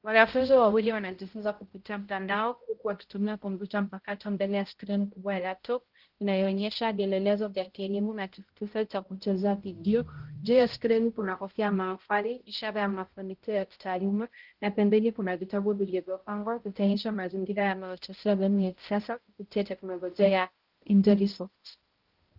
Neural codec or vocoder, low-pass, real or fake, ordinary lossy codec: codec, 16 kHz, 0.5 kbps, X-Codec, HuBERT features, trained on balanced general audio; 5.4 kHz; fake; Opus, 16 kbps